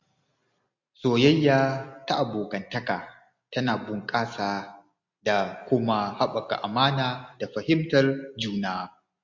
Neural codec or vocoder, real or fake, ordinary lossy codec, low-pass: none; real; MP3, 48 kbps; 7.2 kHz